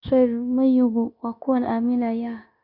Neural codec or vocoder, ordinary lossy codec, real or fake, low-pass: codec, 16 kHz, 0.9 kbps, LongCat-Audio-Codec; none; fake; 5.4 kHz